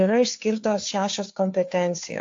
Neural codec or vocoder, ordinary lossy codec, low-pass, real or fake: codec, 16 kHz, 2 kbps, FunCodec, trained on Chinese and English, 25 frames a second; AAC, 48 kbps; 7.2 kHz; fake